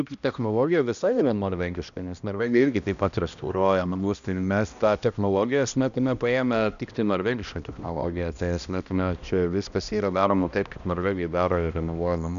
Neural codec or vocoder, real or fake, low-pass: codec, 16 kHz, 1 kbps, X-Codec, HuBERT features, trained on balanced general audio; fake; 7.2 kHz